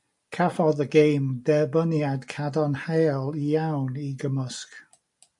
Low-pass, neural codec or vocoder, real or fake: 10.8 kHz; none; real